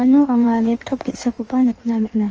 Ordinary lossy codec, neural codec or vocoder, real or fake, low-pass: Opus, 24 kbps; codec, 16 kHz in and 24 kHz out, 1.1 kbps, FireRedTTS-2 codec; fake; 7.2 kHz